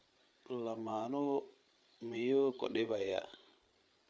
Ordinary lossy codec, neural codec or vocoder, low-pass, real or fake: none; codec, 16 kHz, 8 kbps, FreqCodec, larger model; none; fake